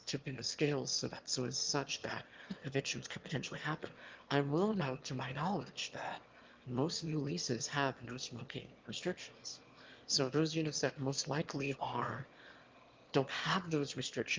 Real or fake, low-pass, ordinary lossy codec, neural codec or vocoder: fake; 7.2 kHz; Opus, 32 kbps; autoencoder, 22.05 kHz, a latent of 192 numbers a frame, VITS, trained on one speaker